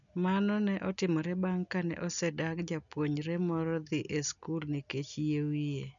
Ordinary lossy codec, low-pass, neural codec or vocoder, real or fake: none; 7.2 kHz; none; real